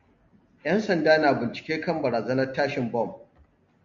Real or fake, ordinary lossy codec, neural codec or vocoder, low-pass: real; MP3, 64 kbps; none; 7.2 kHz